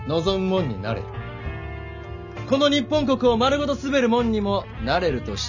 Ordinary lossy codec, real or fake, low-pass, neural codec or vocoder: none; real; 7.2 kHz; none